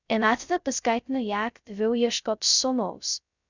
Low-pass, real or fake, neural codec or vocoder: 7.2 kHz; fake; codec, 16 kHz, 0.2 kbps, FocalCodec